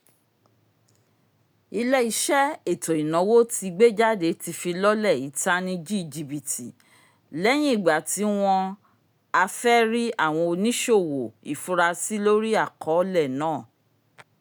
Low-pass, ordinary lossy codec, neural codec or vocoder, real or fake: none; none; none; real